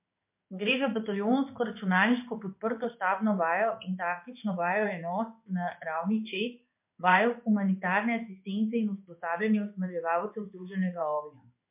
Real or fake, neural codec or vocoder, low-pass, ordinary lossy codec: fake; codec, 16 kHz in and 24 kHz out, 1 kbps, XY-Tokenizer; 3.6 kHz; MP3, 32 kbps